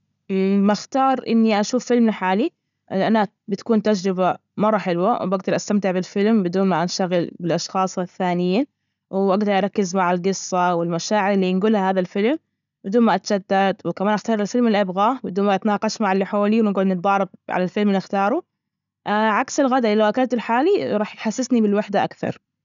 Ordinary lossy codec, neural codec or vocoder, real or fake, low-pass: none; none; real; 7.2 kHz